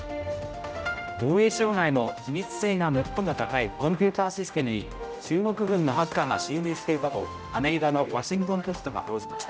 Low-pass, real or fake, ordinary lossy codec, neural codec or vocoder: none; fake; none; codec, 16 kHz, 0.5 kbps, X-Codec, HuBERT features, trained on general audio